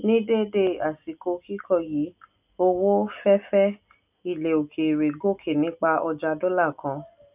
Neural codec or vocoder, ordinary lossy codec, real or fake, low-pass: none; none; real; 3.6 kHz